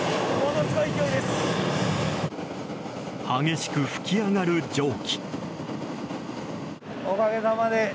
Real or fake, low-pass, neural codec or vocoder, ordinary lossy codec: real; none; none; none